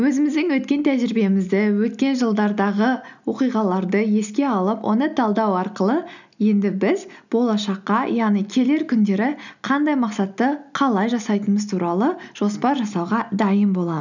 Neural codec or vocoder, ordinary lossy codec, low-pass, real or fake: none; none; 7.2 kHz; real